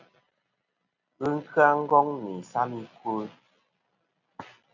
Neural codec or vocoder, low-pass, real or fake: none; 7.2 kHz; real